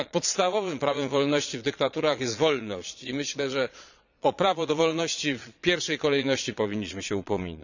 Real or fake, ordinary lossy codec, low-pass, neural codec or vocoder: fake; none; 7.2 kHz; vocoder, 22.05 kHz, 80 mel bands, Vocos